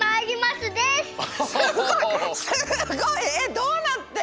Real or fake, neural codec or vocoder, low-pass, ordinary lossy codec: real; none; none; none